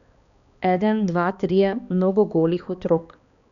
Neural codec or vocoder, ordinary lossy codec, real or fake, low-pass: codec, 16 kHz, 2 kbps, X-Codec, HuBERT features, trained on balanced general audio; none; fake; 7.2 kHz